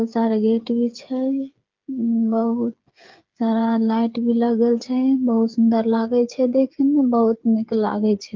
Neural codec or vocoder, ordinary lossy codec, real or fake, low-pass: codec, 16 kHz, 8 kbps, FreqCodec, smaller model; Opus, 32 kbps; fake; 7.2 kHz